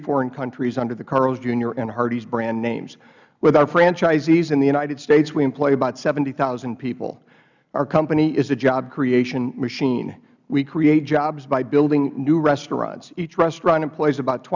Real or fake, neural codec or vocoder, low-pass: real; none; 7.2 kHz